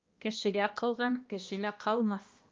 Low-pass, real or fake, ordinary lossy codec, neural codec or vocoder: 7.2 kHz; fake; Opus, 32 kbps; codec, 16 kHz, 1 kbps, X-Codec, HuBERT features, trained on balanced general audio